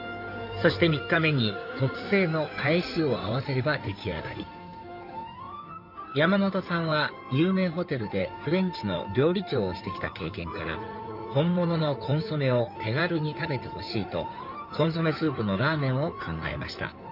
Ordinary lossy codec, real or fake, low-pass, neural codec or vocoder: none; fake; 5.4 kHz; codec, 16 kHz in and 24 kHz out, 2.2 kbps, FireRedTTS-2 codec